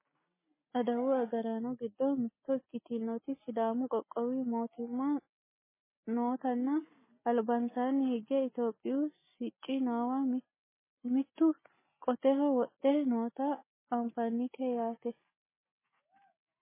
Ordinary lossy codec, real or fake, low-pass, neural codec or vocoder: MP3, 16 kbps; real; 3.6 kHz; none